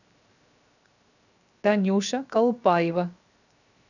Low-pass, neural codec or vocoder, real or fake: 7.2 kHz; codec, 16 kHz, 0.7 kbps, FocalCodec; fake